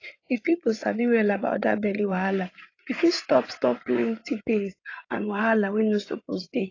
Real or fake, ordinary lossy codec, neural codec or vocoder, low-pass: fake; AAC, 32 kbps; codec, 16 kHz in and 24 kHz out, 2.2 kbps, FireRedTTS-2 codec; 7.2 kHz